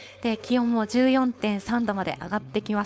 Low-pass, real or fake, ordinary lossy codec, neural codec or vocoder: none; fake; none; codec, 16 kHz, 4.8 kbps, FACodec